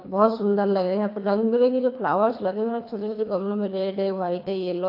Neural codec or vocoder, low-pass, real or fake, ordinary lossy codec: codec, 24 kHz, 3 kbps, HILCodec; 5.4 kHz; fake; AAC, 32 kbps